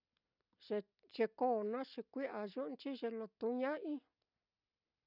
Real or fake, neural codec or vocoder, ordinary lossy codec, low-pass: real; none; none; 5.4 kHz